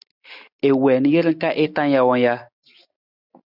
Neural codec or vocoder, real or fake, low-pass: none; real; 5.4 kHz